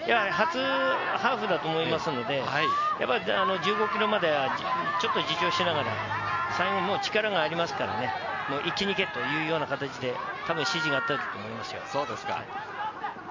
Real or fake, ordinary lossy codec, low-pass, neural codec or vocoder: real; MP3, 64 kbps; 7.2 kHz; none